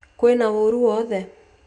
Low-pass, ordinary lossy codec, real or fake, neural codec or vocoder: 10.8 kHz; none; real; none